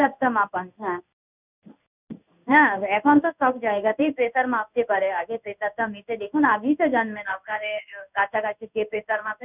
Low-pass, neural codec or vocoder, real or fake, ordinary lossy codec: 3.6 kHz; codec, 16 kHz in and 24 kHz out, 1 kbps, XY-Tokenizer; fake; none